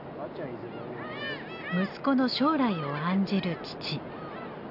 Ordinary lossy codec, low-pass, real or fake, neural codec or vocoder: none; 5.4 kHz; real; none